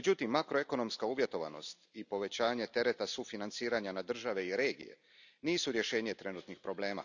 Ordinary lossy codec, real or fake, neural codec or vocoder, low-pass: none; real; none; 7.2 kHz